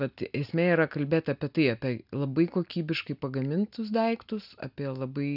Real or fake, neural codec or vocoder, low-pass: real; none; 5.4 kHz